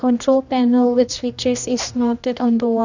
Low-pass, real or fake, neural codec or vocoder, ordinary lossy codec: 7.2 kHz; fake; codec, 16 kHz, 1 kbps, X-Codec, HuBERT features, trained on general audio; none